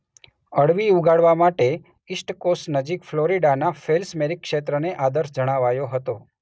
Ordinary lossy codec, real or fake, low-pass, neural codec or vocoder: none; real; none; none